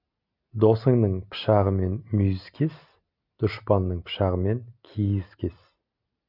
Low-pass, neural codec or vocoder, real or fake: 5.4 kHz; none; real